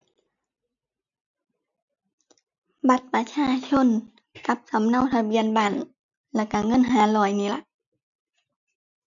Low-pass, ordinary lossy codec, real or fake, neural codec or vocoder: 7.2 kHz; none; real; none